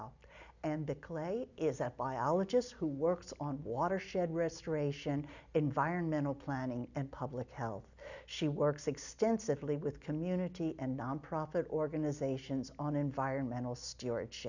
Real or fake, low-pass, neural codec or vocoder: real; 7.2 kHz; none